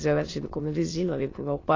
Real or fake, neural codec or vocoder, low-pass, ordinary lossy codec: fake; autoencoder, 22.05 kHz, a latent of 192 numbers a frame, VITS, trained on many speakers; 7.2 kHz; AAC, 32 kbps